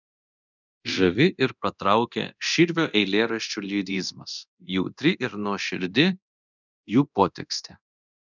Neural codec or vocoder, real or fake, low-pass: codec, 24 kHz, 0.9 kbps, DualCodec; fake; 7.2 kHz